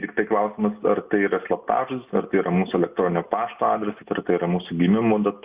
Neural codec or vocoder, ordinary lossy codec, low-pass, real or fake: none; Opus, 64 kbps; 3.6 kHz; real